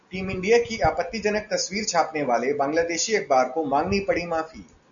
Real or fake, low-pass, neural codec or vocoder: real; 7.2 kHz; none